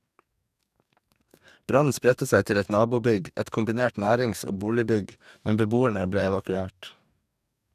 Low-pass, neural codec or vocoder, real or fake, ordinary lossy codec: 14.4 kHz; codec, 44.1 kHz, 2.6 kbps, DAC; fake; none